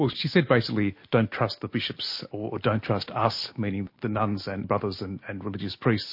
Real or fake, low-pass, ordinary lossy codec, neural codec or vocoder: real; 5.4 kHz; MP3, 32 kbps; none